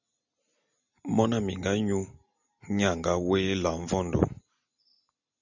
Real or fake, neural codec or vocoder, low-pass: real; none; 7.2 kHz